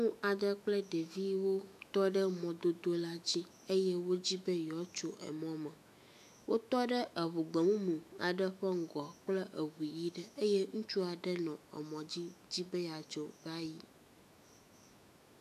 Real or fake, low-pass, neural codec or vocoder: fake; 14.4 kHz; autoencoder, 48 kHz, 128 numbers a frame, DAC-VAE, trained on Japanese speech